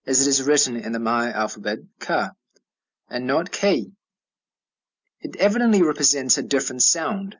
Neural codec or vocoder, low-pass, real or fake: none; 7.2 kHz; real